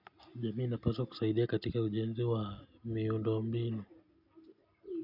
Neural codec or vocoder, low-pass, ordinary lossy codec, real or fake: none; 5.4 kHz; none; real